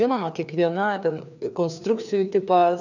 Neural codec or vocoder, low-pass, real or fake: codec, 16 kHz, 2 kbps, FreqCodec, larger model; 7.2 kHz; fake